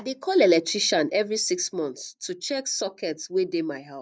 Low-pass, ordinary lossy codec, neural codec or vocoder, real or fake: none; none; none; real